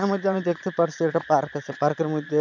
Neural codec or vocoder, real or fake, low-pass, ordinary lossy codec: vocoder, 22.05 kHz, 80 mel bands, Vocos; fake; 7.2 kHz; none